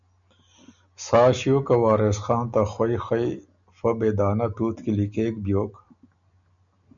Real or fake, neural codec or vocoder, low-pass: real; none; 7.2 kHz